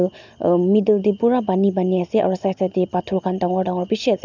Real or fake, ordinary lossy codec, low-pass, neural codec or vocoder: real; none; 7.2 kHz; none